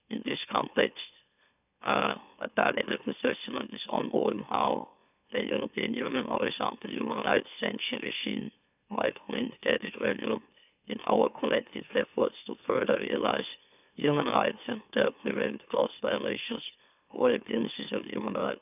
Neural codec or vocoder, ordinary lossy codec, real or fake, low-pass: autoencoder, 44.1 kHz, a latent of 192 numbers a frame, MeloTTS; none; fake; 3.6 kHz